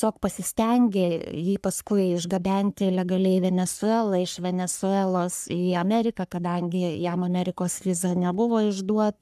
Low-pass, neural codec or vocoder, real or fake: 14.4 kHz; codec, 44.1 kHz, 3.4 kbps, Pupu-Codec; fake